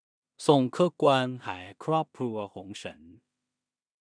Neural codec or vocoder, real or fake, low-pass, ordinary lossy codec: codec, 16 kHz in and 24 kHz out, 0.4 kbps, LongCat-Audio-Codec, two codebook decoder; fake; 9.9 kHz; none